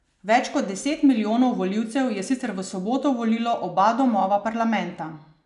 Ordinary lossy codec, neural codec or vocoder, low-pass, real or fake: none; none; 10.8 kHz; real